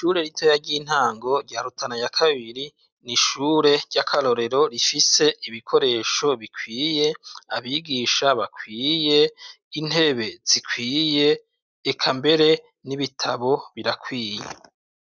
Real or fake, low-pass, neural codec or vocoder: real; 7.2 kHz; none